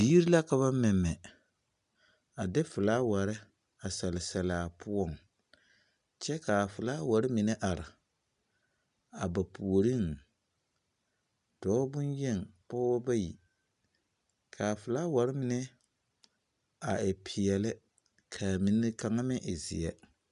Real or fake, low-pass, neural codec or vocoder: real; 10.8 kHz; none